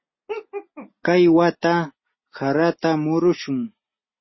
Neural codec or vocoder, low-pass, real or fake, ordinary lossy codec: none; 7.2 kHz; real; MP3, 24 kbps